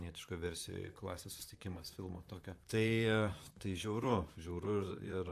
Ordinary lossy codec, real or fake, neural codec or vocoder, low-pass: AAC, 96 kbps; fake; vocoder, 44.1 kHz, 128 mel bands, Pupu-Vocoder; 14.4 kHz